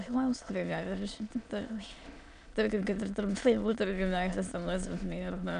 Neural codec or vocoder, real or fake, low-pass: autoencoder, 22.05 kHz, a latent of 192 numbers a frame, VITS, trained on many speakers; fake; 9.9 kHz